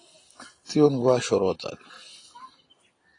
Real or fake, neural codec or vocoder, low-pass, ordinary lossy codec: real; none; 9.9 kHz; AAC, 32 kbps